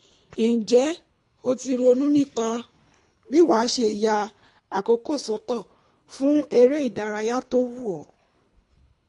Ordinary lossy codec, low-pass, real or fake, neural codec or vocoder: AAC, 48 kbps; 10.8 kHz; fake; codec, 24 kHz, 3 kbps, HILCodec